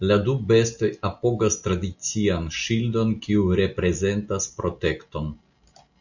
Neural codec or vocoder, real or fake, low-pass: none; real; 7.2 kHz